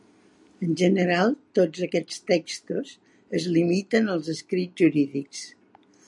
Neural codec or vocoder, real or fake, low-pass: none; real; 10.8 kHz